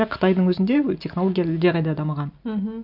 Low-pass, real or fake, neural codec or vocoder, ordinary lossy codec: 5.4 kHz; real; none; none